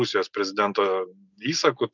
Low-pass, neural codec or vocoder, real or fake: 7.2 kHz; none; real